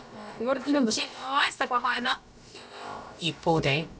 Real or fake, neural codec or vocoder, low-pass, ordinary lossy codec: fake; codec, 16 kHz, about 1 kbps, DyCAST, with the encoder's durations; none; none